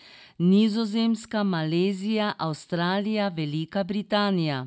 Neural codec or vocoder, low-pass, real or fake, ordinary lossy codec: none; none; real; none